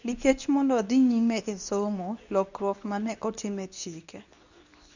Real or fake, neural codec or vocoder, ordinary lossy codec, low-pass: fake; codec, 24 kHz, 0.9 kbps, WavTokenizer, medium speech release version 2; none; 7.2 kHz